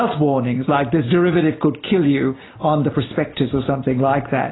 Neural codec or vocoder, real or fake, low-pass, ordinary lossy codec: none; real; 7.2 kHz; AAC, 16 kbps